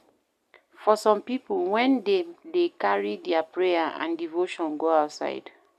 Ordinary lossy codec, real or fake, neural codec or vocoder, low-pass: none; real; none; 14.4 kHz